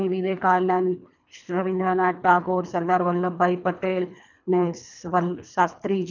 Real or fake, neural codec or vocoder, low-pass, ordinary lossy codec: fake; codec, 24 kHz, 3 kbps, HILCodec; 7.2 kHz; none